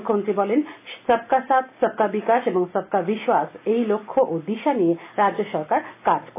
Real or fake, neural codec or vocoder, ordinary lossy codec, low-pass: real; none; AAC, 24 kbps; 3.6 kHz